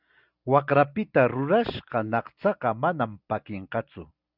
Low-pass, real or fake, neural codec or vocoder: 5.4 kHz; real; none